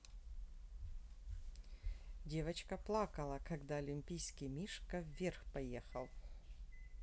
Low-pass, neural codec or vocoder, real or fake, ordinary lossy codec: none; none; real; none